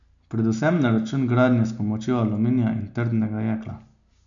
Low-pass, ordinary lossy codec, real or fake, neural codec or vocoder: 7.2 kHz; none; real; none